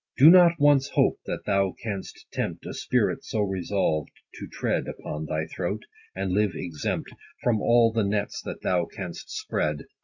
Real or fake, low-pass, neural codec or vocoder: real; 7.2 kHz; none